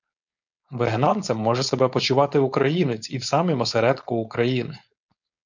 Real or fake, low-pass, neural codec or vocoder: fake; 7.2 kHz; codec, 16 kHz, 4.8 kbps, FACodec